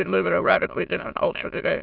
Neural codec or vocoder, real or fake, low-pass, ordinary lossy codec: autoencoder, 22.05 kHz, a latent of 192 numbers a frame, VITS, trained on many speakers; fake; 5.4 kHz; MP3, 48 kbps